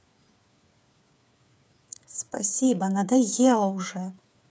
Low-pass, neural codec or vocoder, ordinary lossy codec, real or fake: none; codec, 16 kHz, 8 kbps, FreqCodec, smaller model; none; fake